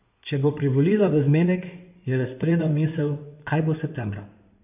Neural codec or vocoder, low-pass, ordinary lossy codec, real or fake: codec, 16 kHz in and 24 kHz out, 2.2 kbps, FireRedTTS-2 codec; 3.6 kHz; none; fake